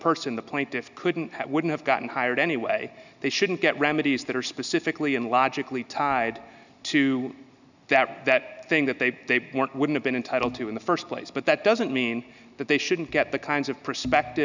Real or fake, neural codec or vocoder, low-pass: real; none; 7.2 kHz